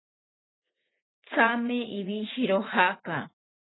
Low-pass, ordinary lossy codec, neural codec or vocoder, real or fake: 7.2 kHz; AAC, 16 kbps; vocoder, 44.1 kHz, 80 mel bands, Vocos; fake